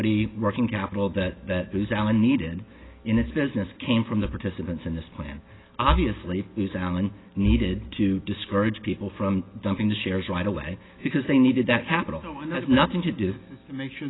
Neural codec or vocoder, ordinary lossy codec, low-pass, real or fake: none; AAC, 16 kbps; 7.2 kHz; real